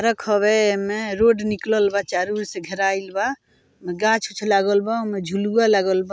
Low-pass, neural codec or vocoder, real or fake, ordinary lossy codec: none; none; real; none